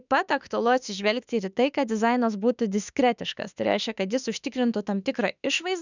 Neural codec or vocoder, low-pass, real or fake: autoencoder, 48 kHz, 32 numbers a frame, DAC-VAE, trained on Japanese speech; 7.2 kHz; fake